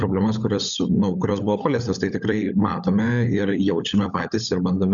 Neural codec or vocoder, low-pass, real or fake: codec, 16 kHz, 16 kbps, FunCodec, trained on LibriTTS, 50 frames a second; 7.2 kHz; fake